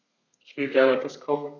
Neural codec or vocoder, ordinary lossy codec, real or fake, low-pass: codec, 32 kHz, 1.9 kbps, SNAC; none; fake; 7.2 kHz